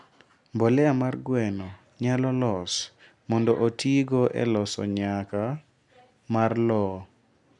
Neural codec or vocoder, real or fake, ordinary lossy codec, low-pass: none; real; none; 10.8 kHz